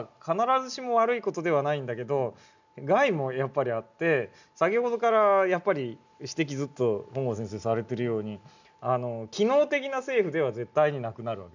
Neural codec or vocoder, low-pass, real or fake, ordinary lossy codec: none; 7.2 kHz; real; none